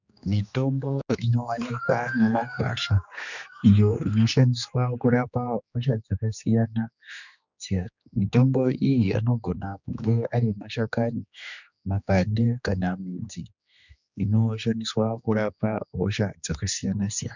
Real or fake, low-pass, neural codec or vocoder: fake; 7.2 kHz; codec, 16 kHz, 2 kbps, X-Codec, HuBERT features, trained on general audio